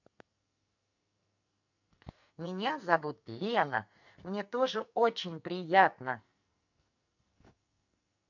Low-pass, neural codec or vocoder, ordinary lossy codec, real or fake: 7.2 kHz; codec, 44.1 kHz, 2.6 kbps, SNAC; none; fake